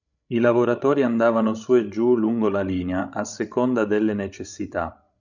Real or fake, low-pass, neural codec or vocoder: fake; 7.2 kHz; codec, 16 kHz, 16 kbps, FreqCodec, larger model